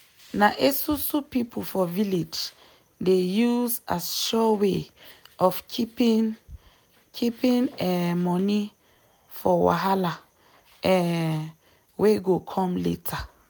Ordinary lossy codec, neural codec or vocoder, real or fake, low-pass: none; none; real; none